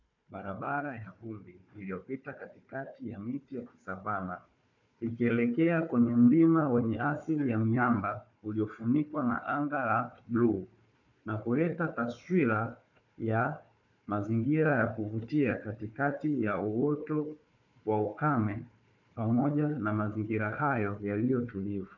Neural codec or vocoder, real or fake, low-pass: codec, 16 kHz, 4 kbps, FunCodec, trained on Chinese and English, 50 frames a second; fake; 7.2 kHz